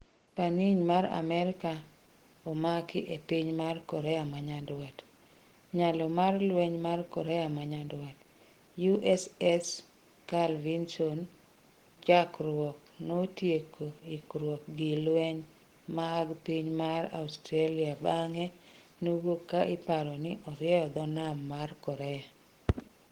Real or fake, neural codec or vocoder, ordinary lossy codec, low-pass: real; none; Opus, 16 kbps; 19.8 kHz